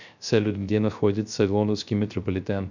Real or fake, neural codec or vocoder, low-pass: fake; codec, 16 kHz, 0.3 kbps, FocalCodec; 7.2 kHz